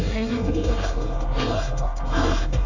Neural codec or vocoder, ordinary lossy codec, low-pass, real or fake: codec, 24 kHz, 1 kbps, SNAC; none; 7.2 kHz; fake